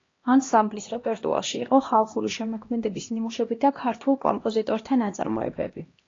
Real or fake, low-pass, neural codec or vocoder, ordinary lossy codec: fake; 7.2 kHz; codec, 16 kHz, 1 kbps, X-Codec, HuBERT features, trained on LibriSpeech; AAC, 32 kbps